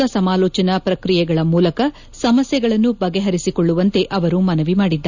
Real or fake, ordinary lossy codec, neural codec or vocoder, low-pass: real; none; none; 7.2 kHz